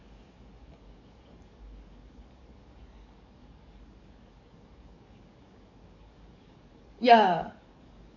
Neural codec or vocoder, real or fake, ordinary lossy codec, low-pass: codec, 44.1 kHz, 7.8 kbps, DAC; fake; none; 7.2 kHz